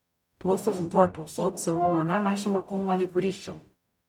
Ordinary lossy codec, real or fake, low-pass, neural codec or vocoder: none; fake; 19.8 kHz; codec, 44.1 kHz, 0.9 kbps, DAC